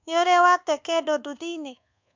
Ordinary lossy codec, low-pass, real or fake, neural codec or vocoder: MP3, 64 kbps; 7.2 kHz; fake; codec, 24 kHz, 1.2 kbps, DualCodec